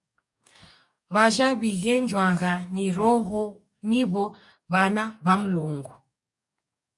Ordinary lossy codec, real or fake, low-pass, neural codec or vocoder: MP3, 96 kbps; fake; 10.8 kHz; codec, 44.1 kHz, 2.6 kbps, DAC